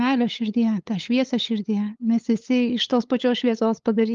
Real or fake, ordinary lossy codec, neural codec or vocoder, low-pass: fake; Opus, 32 kbps; codec, 16 kHz, 8 kbps, FunCodec, trained on LibriTTS, 25 frames a second; 7.2 kHz